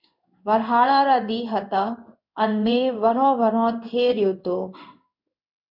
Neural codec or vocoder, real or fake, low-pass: codec, 16 kHz in and 24 kHz out, 1 kbps, XY-Tokenizer; fake; 5.4 kHz